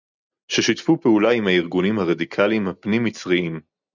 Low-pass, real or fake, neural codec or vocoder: 7.2 kHz; real; none